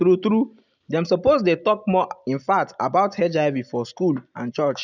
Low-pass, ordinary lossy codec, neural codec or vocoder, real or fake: 7.2 kHz; none; none; real